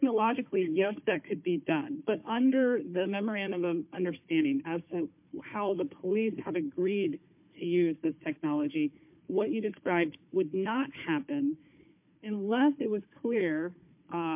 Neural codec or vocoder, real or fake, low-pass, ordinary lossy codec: codec, 16 kHz, 4 kbps, FunCodec, trained on Chinese and English, 50 frames a second; fake; 3.6 kHz; MP3, 32 kbps